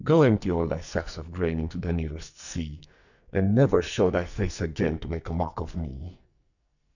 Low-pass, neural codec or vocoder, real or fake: 7.2 kHz; codec, 44.1 kHz, 2.6 kbps, SNAC; fake